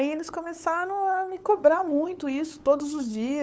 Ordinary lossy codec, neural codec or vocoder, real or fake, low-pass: none; codec, 16 kHz, 8 kbps, FunCodec, trained on LibriTTS, 25 frames a second; fake; none